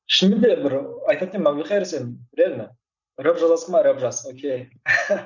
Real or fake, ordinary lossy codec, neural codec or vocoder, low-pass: real; none; none; 7.2 kHz